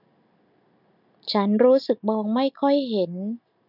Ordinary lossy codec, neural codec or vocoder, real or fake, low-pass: none; none; real; 5.4 kHz